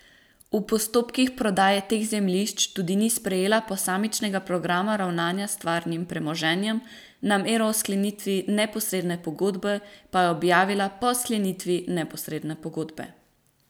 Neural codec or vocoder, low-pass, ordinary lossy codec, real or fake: none; none; none; real